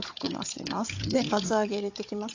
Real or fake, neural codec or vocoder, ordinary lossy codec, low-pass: fake; codec, 16 kHz, 4 kbps, FunCodec, trained on Chinese and English, 50 frames a second; AAC, 48 kbps; 7.2 kHz